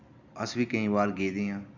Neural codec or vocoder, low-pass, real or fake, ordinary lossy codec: none; 7.2 kHz; real; none